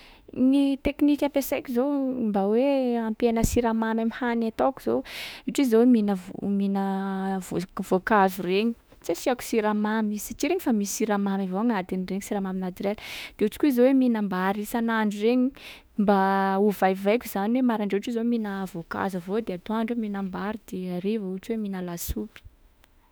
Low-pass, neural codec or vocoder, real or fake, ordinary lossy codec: none; autoencoder, 48 kHz, 32 numbers a frame, DAC-VAE, trained on Japanese speech; fake; none